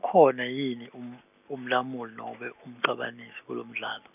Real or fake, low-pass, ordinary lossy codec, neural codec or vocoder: real; 3.6 kHz; none; none